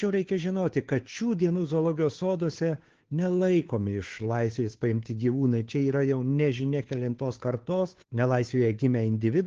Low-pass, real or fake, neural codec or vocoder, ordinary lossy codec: 7.2 kHz; fake; codec, 16 kHz, 2 kbps, FunCodec, trained on Chinese and English, 25 frames a second; Opus, 16 kbps